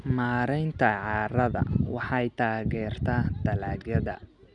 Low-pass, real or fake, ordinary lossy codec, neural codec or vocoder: 9.9 kHz; real; none; none